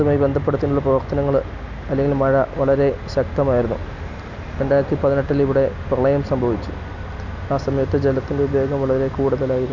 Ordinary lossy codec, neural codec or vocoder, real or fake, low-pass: none; none; real; 7.2 kHz